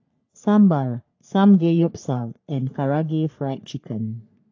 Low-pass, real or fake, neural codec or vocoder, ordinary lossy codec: 7.2 kHz; fake; codec, 44.1 kHz, 3.4 kbps, Pupu-Codec; AAC, 48 kbps